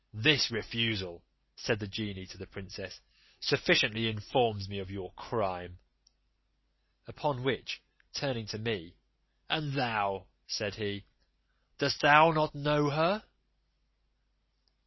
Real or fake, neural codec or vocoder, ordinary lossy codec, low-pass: real; none; MP3, 24 kbps; 7.2 kHz